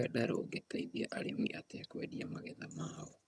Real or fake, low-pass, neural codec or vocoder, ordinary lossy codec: fake; none; vocoder, 22.05 kHz, 80 mel bands, HiFi-GAN; none